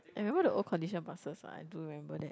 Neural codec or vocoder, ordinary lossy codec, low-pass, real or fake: none; none; none; real